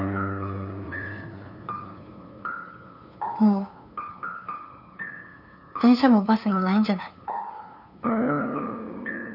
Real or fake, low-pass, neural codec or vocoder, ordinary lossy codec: fake; 5.4 kHz; codec, 16 kHz, 2 kbps, FunCodec, trained on LibriTTS, 25 frames a second; none